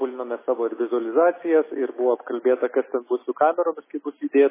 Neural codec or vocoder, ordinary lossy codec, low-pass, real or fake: none; MP3, 16 kbps; 3.6 kHz; real